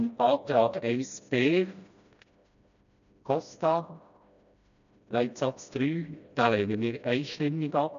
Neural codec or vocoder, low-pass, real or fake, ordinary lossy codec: codec, 16 kHz, 1 kbps, FreqCodec, smaller model; 7.2 kHz; fake; none